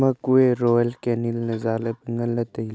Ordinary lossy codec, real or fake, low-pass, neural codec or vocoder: none; real; none; none